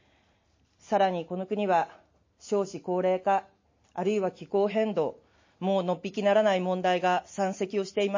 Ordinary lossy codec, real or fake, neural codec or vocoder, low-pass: MP3, 32 kbps; real; none; 7.2 kHz